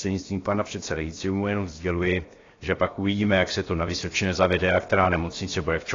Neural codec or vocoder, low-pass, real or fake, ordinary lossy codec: codec, 16 kHz, 0.7 kbps, FocalCodec; 7.2 kHz; fake; AAC, 32 kbps